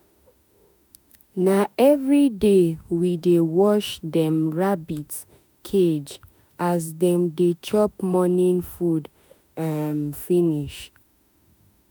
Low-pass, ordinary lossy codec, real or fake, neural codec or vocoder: none; none; fake; autoencoder, 48 kHz, 32 numbers a frame, DAC-VAE, trained on Japanese speech